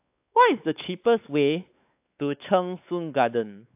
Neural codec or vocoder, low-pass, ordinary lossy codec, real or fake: codec, 16 kHz, 4 kbps, X-Codec, WavLM features, trained on Multilingual LibriSpeech; 3.6 kHz; AAC, 32 kbps; fake